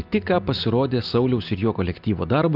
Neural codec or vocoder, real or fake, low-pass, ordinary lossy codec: none; real; 5.4 kHz; Opus, 32 kbps